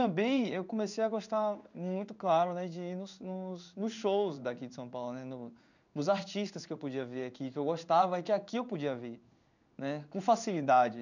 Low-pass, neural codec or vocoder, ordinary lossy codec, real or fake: 7.2 kHz; codec, 16 kHz in and 24 kHz out, 1 kbps, XY-Tokenizer; none; fake